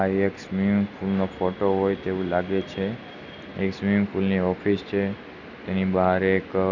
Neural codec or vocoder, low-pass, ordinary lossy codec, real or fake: none; 7.2 kHz; none; real